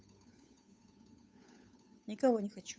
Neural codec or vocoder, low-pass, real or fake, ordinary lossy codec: codec, 16 kHz, 8 kbps, FunCodec, trained on Chinese and English, 25 frames a second; none; fake; none